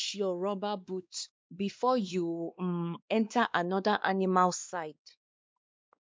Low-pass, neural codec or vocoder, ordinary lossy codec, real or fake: none; codec, 16 kHz, 2 kbps, X-Codec, WavLM features, trained on Multilingual LibriSpeech; none; fake